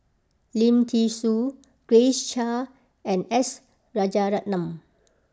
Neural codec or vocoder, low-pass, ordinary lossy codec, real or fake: none; none; none; real